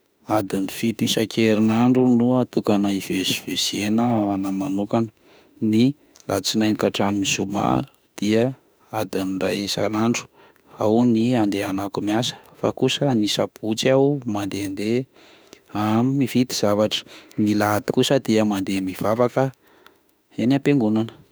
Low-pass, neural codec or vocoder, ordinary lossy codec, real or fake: none; autoencoder, 48 kHz, 32 numbers a frame, DAC-VAE, trained on Japanese speech; none; fake